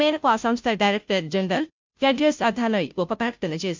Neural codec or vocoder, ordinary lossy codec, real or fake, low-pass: codec, 16 kHz, 0.5 kbps, FunCodec, trained on Chinese and English, 25 frames a second; MP3, 64 kbps; fake; 7.2 kHz